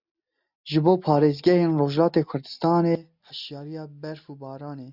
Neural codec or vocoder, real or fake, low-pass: none; real; 5.4 kHz